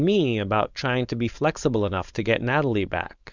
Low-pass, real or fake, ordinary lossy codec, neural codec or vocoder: 7.2 kHz; fake; Opus, 64 kbps; codec, 16 kHz, 4.8 kbps, FACodec